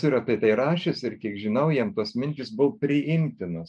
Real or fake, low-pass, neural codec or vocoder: real; 10.8 kHz; none